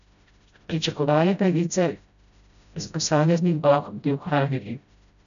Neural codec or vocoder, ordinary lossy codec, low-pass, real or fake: codec, 16 kHz, 0.5 kbps, FreqCodec, smaller model; none; 7.2 kHz; fake